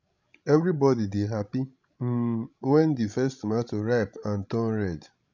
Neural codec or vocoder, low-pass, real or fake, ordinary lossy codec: codec, 16 kHz, 16 kbps, FreqCodec, larger model; 7.2 kHz; fake; none